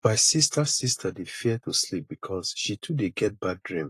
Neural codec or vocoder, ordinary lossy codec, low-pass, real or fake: vocoder, 44.1 kHz, 128 mel bands every 256 samples, BigVGAN v2; AAC, 48 kbps; 14.4 kHz; fake